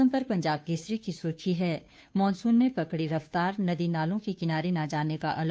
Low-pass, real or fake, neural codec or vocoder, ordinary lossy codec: none; fake; codec, 16 kHz, 2 kbps, FunCodec, trained on Chinese and English, 25 frames a second; none